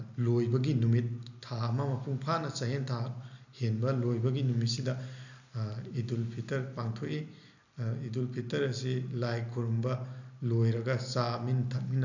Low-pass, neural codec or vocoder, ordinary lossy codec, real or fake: 7.2 kHz; none; none; real